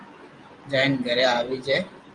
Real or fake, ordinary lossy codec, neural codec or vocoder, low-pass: fake; Opus, 32 kbps; vocoder, 44.1 kHz, 128 mel bands every 512 samples, BigVGAN v2; 10.8 kHz